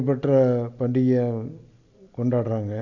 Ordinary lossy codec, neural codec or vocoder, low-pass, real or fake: Opus, 64 kbps; none; 7.2 kHz; real